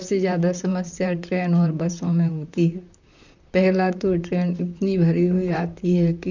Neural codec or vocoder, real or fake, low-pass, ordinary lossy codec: vocoder, 44.1 kHz, 128 mel bands, Pupu-Vocoder; fake; 7.2 kHz; none